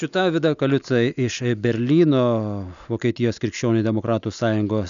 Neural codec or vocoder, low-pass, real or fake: none; 7.2 kHz; real